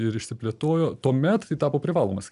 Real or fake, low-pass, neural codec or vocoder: real; 10.8 kHz; none